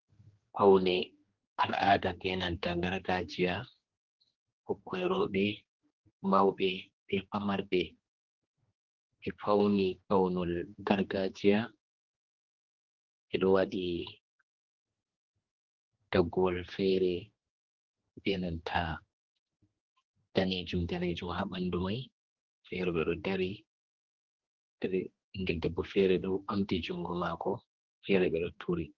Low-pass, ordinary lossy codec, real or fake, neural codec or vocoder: 7.2 kHz; Opus, 16 kbps; fake; codec, 16 kHz, 2 kbps, X-Codec, HuBERT features, trained on general audio